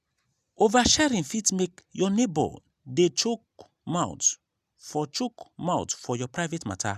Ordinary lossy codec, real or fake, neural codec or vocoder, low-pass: none; real; none; 14.4 kHz